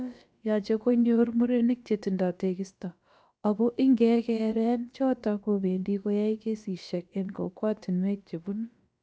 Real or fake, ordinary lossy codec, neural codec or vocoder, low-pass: fake; none; codec, 16 kHz, about 1 kbps, DyCAST, with the encoder's durations; none